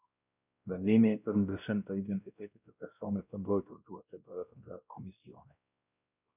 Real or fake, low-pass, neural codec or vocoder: fake; 3.6 kHz; codec, 16 kHz, 1 kbps, X-Codec, WavLM features, trained on Multilingual LibriSpeech